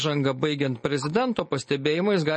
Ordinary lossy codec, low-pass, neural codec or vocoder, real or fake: MP3, 32 kbps; 10.8 kHz; none; real